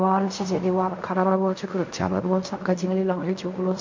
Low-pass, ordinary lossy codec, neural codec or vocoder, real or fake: 7.2 kHz; MP3, 48 kbps; codec, 16 kHz in and 24 kHz out, 0.4 kbps, LongCat-Audio-Codec, fine tuned four codebook decoder; fake